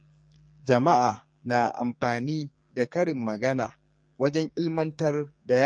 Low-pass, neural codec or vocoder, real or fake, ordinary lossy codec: 9.9 kHz; codec, 44.1 kHz, 2.6 kbps, SNAC; fake; MP3, 48 kbps